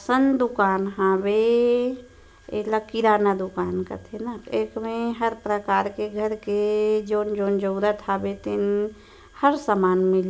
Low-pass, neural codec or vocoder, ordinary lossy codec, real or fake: none; none; none; real